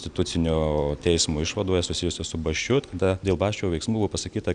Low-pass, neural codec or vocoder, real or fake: 9.9 kHz; none; real